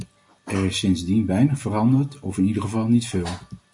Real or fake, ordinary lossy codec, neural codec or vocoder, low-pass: real; MP3, 48 kbps; none; 10.8 kHz